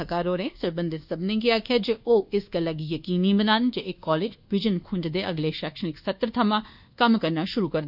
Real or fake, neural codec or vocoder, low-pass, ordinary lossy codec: fake; codec, 24 kHz, 1.2 kbps, DualCodec; 5.4 kHz; none